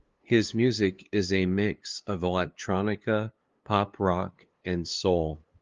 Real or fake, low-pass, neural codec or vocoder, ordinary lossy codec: fake; 7.2 kHz; codec, 16 kHz, 2 kbps, FunCodec, trained on LibriTTS, 25 frames a second; Opus, 32 kbps